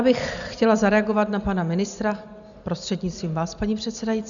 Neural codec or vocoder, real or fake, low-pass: none; real; 7.2 kHz